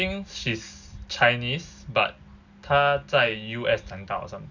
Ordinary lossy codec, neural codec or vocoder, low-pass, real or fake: none; none; 7.2 kHz; real